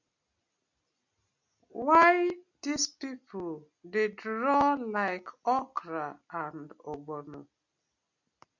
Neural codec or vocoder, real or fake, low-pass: none; real; 7.2 kHz